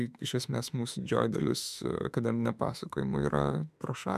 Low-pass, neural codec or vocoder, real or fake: 14.4 kHz; autoencoder, 48 kHz, 32 numbers a frame, DAC-VAE, trained on Japanese speech; fake